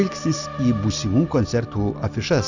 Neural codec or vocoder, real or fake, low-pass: none; real; 7.2 kHz